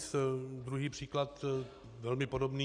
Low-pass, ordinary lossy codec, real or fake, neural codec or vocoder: 9.9 kHz; AAC, 64 kbps; real; none